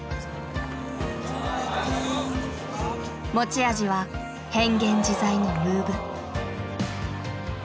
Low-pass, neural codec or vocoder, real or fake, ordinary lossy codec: none; none; real; none